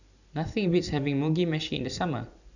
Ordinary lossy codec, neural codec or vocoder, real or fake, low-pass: none; none; real; 7.2 kHz